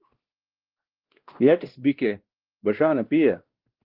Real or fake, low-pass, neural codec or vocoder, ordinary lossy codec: fake; 5.4 kHz; codec, 16 kHz, 1 kbps, X-Codec, WavLM features, trained on Multilingual LibriSpeech; Opus, 16 kbps